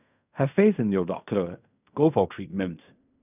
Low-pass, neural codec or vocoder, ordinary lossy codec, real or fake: 3.6 kHz; codec, 16 kHz in and 24 kHz out, 0.4 kbps, LongCat-Audio-Codec, fine tuned four codebook decoder; none; fake